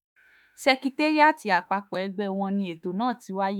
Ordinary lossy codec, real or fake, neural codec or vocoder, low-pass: none; fake; autoencoder, 48 kHz, 32 numbers a frame, DAC-VAE, trained on Japanese speech; 19.8 kHz